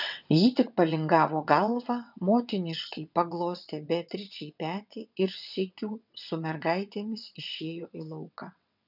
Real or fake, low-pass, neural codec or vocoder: fake; 5.4 kHz; vocoder, 22.05 kHz, 80 mel bands, WaveNeXt